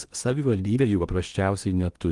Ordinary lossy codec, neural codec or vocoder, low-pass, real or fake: Opus, 32 kbps; codec, 16 kHz in and 24 kHz out, 0.8 kbps, FocalCodec, streaming, 65536 codes; 10.8 kHz; fake